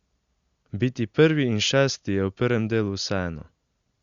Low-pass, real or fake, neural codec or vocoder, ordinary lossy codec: 7.2 kHz; real; none; Opus, 64 kbps